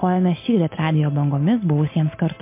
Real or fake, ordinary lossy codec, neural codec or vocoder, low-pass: real; MP3, 24 kbps; none; 3.6 kHz